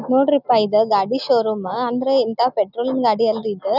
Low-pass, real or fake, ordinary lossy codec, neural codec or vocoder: 5.4 kHz; real; none; none